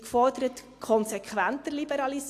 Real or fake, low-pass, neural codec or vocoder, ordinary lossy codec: real; 14.4 kHz; none; AAC, 64 kbps